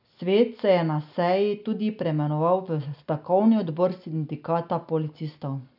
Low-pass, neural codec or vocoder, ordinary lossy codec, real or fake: 5.4 kHz; none; none; real